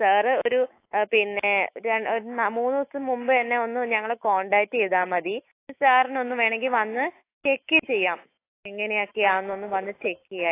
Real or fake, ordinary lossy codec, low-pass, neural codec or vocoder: fake; AAC, 24 kbps; 3.6 kHz; autoencoder, 48 kHz, 128 numbers a frame, DAC-VAE, trained on Japanese speech